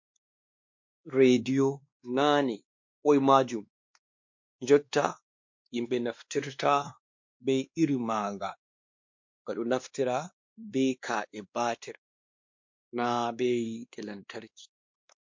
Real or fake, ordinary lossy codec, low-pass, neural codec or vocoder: fake; MP3, 48 kbps; 7.2 kHz; codec, 16 kHz, 2 kbps, X-Codec, WavLM features, trained on Multilingual LibriSpeech